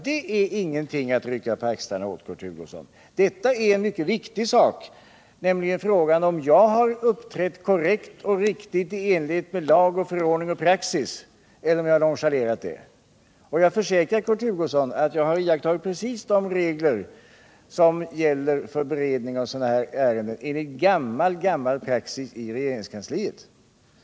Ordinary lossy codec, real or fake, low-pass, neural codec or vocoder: none; real; none; none